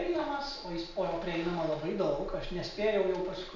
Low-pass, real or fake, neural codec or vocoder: 7.2 kHz; real; none